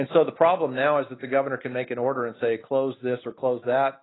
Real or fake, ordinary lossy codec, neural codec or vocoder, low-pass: real; AAC, 16 kbps; none; 7.2 kHz